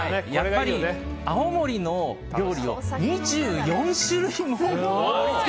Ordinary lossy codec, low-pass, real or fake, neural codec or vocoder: none; none; real; none